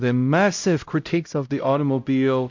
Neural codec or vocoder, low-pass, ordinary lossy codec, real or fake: codec, 16 kHz, 0.5 kbps, X-Codec, HuBERT features, trained on LibriSpeech; 7.2 kHz; MP3, 48 kbps; fake